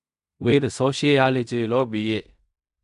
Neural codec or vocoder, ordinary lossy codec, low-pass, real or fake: codec, 16 kHz in and 24 kHz out, 0.4 kbps, LongCat-Audio-Codec, fine tuned four codebook decoder; none; 10.8 kHz; fake